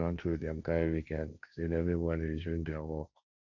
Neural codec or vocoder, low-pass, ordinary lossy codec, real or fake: codec, 16 kHz, 1.1 kbps, Voila-Tokenizer; 7.2 kHz; none; fake